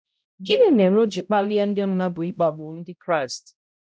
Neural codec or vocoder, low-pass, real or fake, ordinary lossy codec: codec, 16 kHz, 0.5 kbps, X-Codec, HuBERT features, trained on balanced general audio; none; fake; none